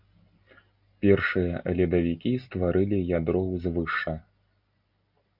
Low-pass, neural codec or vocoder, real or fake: 5.4 kHz; none; real